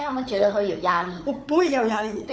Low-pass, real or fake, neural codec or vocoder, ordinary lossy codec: none; fake; codec, 16 kHz, 4 kbps, FunCodec, trained on Chinese and English, 50 frames a second; none